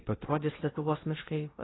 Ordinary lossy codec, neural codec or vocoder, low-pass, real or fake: AAC, 16 kbps; codec, 16 kHz in and 24 kHz out, 0.6 kbps, FocalCodec, streaming, 2048 codes; 7.2 kHz; fake